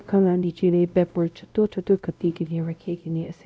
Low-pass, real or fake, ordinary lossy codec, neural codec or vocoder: none; fake; none; codec, 16 kHz, 0.5 kbps, X-Codec, WavLM features, trained on Multilingual LibriSpeech